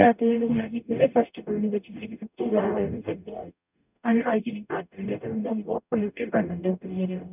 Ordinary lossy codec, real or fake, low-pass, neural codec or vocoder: none; fake; 3.6 kHz; codec, 44.1 kHz, 0.9 kbps, DAC